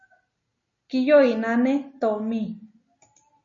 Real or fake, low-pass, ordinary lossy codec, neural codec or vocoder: real; 7.2 kHz; MP3, 32 kbps; none